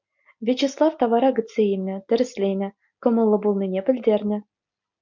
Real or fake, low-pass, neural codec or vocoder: real; 7.2 kHz; none